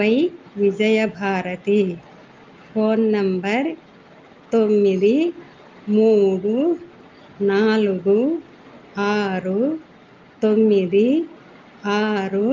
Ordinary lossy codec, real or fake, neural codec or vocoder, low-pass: Opus, 24 kbps; real; none; 7.2 kHz